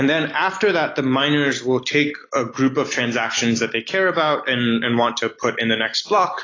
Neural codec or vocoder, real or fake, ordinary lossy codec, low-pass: none; real; AAC, 32 kbps; 7.2 kHz